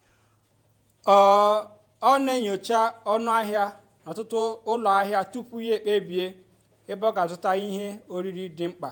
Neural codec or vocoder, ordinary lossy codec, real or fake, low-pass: vocoder, 48 kHz, 128 mel bands, Vocos; none; fake; none